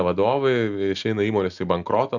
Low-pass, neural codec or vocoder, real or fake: 7.2 kHz; none; real